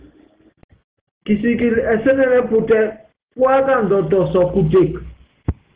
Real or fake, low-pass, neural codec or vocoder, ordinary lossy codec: real; 3.6 kHz; none; Opus, 16 kbps